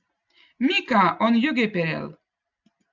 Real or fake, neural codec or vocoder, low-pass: real; none; 7.2 kHz